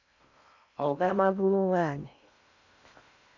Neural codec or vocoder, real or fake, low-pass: codec, 16 kHz in and 24 kHz out, 0.6 kbps, FocalCodec, streaming, 4096 codes; fake; 7.2 kHz